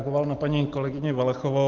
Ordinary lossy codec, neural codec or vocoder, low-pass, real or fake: Opus, 16 kbps; none; 7.2 kHz; real